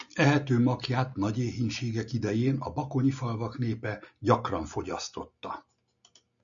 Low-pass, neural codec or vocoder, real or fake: 7.2 kHz; none; real